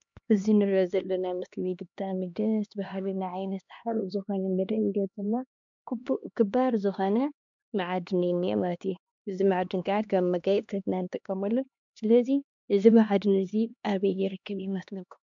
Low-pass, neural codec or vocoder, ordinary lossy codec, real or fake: 7.2 kHz; codec, 16 kHz, 2 kbps, X-Codec, HuBERT features, trained on LibriSpeech; MP3, 64 kbps; fake